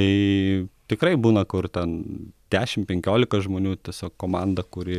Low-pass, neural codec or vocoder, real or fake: 14.4 kHz; none; real